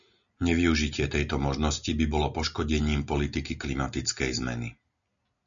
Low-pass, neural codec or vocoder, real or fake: 7.2 kHz; none; real